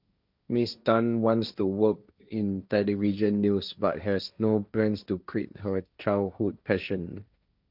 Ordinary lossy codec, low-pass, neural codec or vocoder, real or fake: none; 5.4 kHz; codec, 16 kHz, 1.1 kbps, Voila-Tokenizer; fake